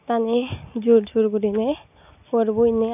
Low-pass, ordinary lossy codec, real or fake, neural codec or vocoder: 3.6 kHz; none; real; none